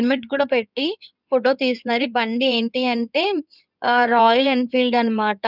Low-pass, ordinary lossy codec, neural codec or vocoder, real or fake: 5.4 kHz; none; codec, 16 kHz in and 24 kHz out, 2.2 kbps, FireRedTTS-2 codec; fake